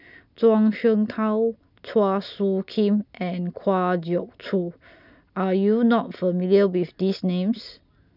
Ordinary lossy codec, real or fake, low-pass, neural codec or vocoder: none; real; 5.4 kHz; none